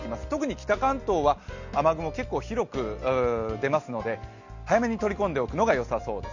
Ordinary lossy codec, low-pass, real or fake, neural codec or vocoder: none; 7.2 kHz; real; none